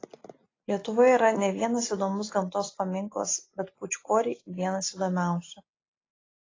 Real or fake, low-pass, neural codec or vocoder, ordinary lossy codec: real; 7.2 kHz; none; AAC, 32 kbps